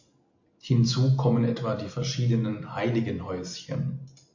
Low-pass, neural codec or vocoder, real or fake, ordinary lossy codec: 7.2 kHz; none; real; MP3, 48 kbps